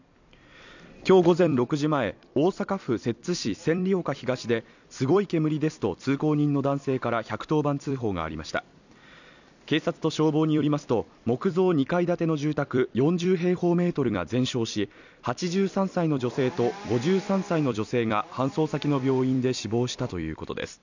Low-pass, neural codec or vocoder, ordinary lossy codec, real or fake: 7.2 kHz; vocoder, 44.1 kHz, 128 mel bands every 256 samples, BigVGAN v2; none; fake